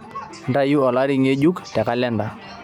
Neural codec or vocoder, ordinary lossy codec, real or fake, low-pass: none; none; real; 19.8 kHz